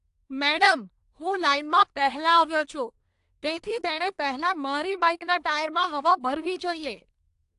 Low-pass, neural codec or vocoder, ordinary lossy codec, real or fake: 10.8 kHz; codec, 24 kHz, 1 kbps, SNAC; AAC, 64 kbps; fake